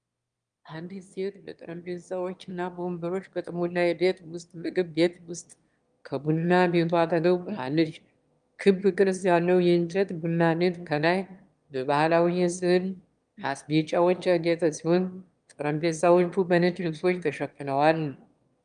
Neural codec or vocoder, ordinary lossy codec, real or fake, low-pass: autoencoder, 22.05 kHz, a latent of 192 numbers a frame, VITS, trained on one speaker; Opus, 32 kbps; fake; 9.9 kHz